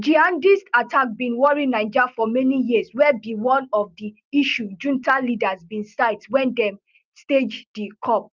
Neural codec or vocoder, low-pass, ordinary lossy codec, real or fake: none; 7.2 kHz; Opus, 24 kbps; real